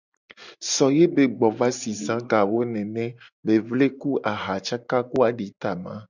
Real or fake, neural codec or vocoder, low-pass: real; none; 7.2 kHz